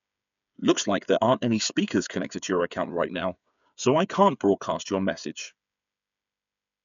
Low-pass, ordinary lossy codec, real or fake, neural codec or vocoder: 7.2 kHz; none; fake; codec, 16 kHz, 8 kbps, FreqCodec, smaller model